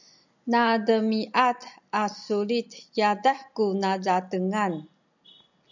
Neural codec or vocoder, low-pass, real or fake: none; 7.2 kHz; real